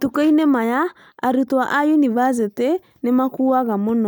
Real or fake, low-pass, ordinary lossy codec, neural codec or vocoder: real; none; none; none